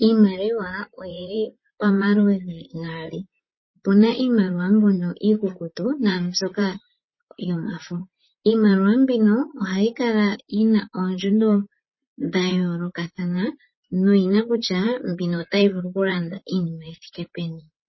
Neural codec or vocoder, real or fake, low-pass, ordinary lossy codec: vocoder, 44.1 kHz, 128 mel bands, Pupu-Vocoder; fake; 7.2 kHz; MP3, 24 kbps